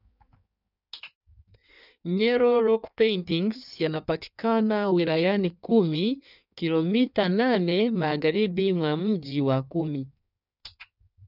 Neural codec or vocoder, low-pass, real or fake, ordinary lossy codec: codec, 16 kHz in and 24 kHz out, 1.1 kbps, FireRedTTS-2 codec; 5.4 kHz; fake; none